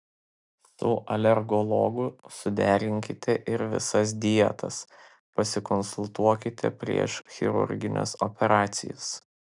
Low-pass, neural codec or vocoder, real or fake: 10.8 kHz; none; real